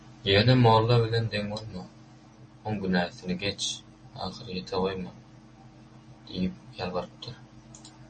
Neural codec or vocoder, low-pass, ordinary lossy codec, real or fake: none; 10.8 kHz; MP3, 32 kbps; real